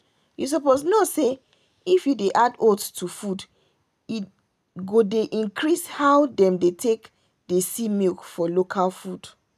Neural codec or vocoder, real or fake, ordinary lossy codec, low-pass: none; real; none; 14.4 kHz